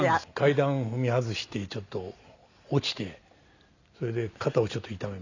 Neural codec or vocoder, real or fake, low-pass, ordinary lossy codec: none; real; 7.2 kHz; none